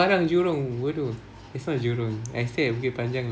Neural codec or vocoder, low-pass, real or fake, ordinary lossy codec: none; none; real; none